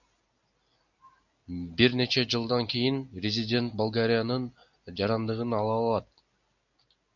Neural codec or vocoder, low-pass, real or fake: none; 7.2 kHz; real